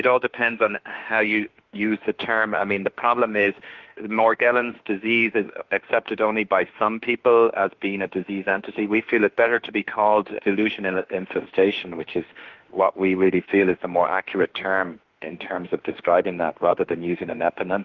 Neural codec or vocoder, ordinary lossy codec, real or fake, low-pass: autoencoder, 48 kHz, 32 numbers a frame, DAC-VAE, trained on Japanese speech; Opus, 16 kbps; fake; 7.2 kHz